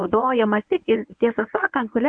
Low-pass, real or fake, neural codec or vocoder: 9.9 kHz; fake; codec, 24 kHz, 0.9 kbps, WavTokenizer, medium speech release version 1